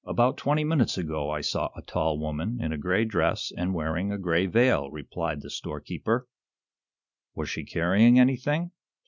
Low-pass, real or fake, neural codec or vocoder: 7.2 kHz; real; none